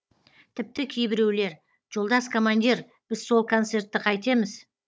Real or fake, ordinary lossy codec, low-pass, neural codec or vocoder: fake; none; none; codec, 16 kHz, 16 kbps, FunCodec, trained on Chinese and English, 50 frames a second